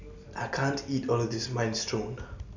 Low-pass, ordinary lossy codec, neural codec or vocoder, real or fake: 7.2 kHz; none; none; real